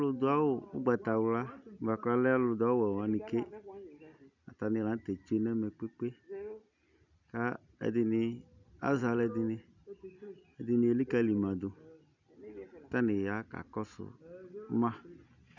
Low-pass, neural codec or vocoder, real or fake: 7.2 kHz; none; real